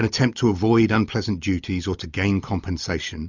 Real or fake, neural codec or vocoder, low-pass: real; none; 7.2 kHz